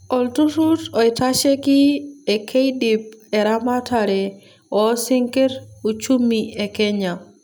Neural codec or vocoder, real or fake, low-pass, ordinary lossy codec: none; real; none; none